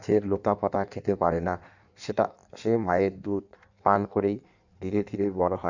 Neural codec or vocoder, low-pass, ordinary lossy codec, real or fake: codec, 16 kHz in and 24 kHz out, 1.1 kbps, FireRedTTS-2 codec; 7.2 kHz; none; fake